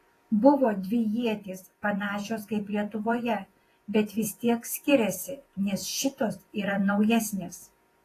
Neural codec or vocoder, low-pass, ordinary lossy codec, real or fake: vocoder, 48 kHz, 128 mel bands, Vocos; 14.4 kHz; AAC, 48 kbps; fake